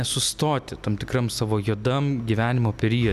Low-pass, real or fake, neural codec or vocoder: 14.4 kHz; real; none